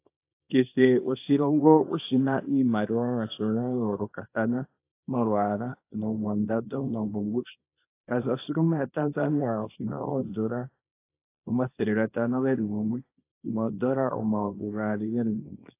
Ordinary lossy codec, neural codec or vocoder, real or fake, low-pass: AAC, 24 kbps; codec, 24 kHz, 0.9 kbps, WavTokenizer, small release; fake; 3.6 kHz